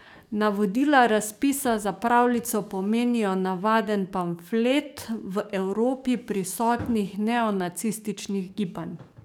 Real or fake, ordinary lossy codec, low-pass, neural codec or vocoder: fake; none; 19.8 kHz; codec, 44.1 kHz, 7.8 kbps, DAC